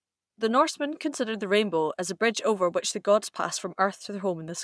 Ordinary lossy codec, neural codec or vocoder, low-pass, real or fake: none; vocoder, 22.05 kHz, 80 mel bands, Vocos; none; fake